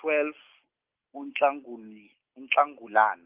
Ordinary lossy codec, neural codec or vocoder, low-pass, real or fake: Opus, 32 kbps; none; 3.6 kHz; real